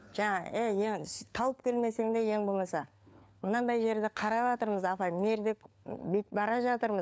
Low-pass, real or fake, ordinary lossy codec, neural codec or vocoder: none; fake; none; codec, 16 kHz, 4 kbps, FunCodec, trained on LibriTTS, 50 frames a second